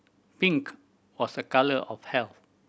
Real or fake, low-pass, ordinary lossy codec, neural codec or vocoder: real; none; none; none